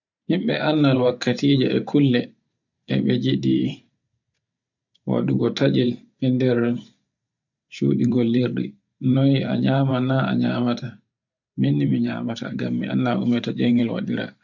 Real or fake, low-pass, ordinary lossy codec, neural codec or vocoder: fake; 7.2 kHz; none; vocoder, 44.1 kHz, 128 mel bands every 512 samples, BigVGAN v2